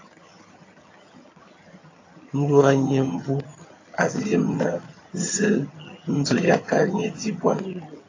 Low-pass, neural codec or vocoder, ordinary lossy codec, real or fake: 7.2 kHz; vocoder, 22.05 kHz, 80 mel bands, HiFi-GAN; AAC, 32 kbps; fake